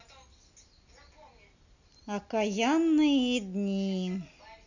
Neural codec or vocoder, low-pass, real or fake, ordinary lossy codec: none; 7.2 kHz; real; none